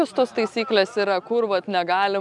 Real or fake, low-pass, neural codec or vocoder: real; 10.8 kHz; none